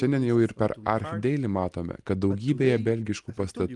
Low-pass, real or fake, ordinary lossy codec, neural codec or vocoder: 10.8 kHz; real; Opus, 32 kbps; none